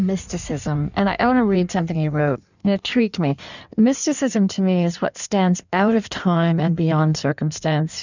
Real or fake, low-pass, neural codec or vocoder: fake; 7.2 kHz; codec, 16 kHz in and 24 kHz out, 1.1 kbps, FireRedTTS-2 codec